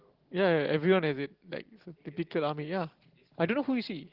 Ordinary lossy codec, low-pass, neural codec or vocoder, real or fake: Opus, 16 kbps; 5.4 kHz; none; real